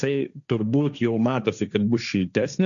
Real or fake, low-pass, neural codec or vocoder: fake; 7.2 kHz; codec, 16 kHz, 1.1 kbps, Voila-Tokenizer